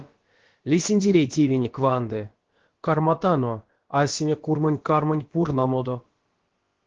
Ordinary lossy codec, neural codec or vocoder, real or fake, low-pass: Opus, 16 kbps; codec, 16 kHz, about 1 kbps, DyCAST, with the encoder's durations; fake; 7.2 kHz